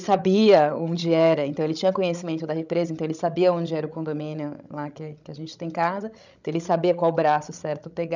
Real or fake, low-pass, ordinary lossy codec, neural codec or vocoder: fake; 7.2 kHz; none; codec, 16 kHz, 16 kbps, FreqCodec, larger model